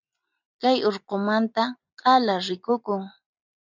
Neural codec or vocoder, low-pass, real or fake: none; 7.2 kHz; real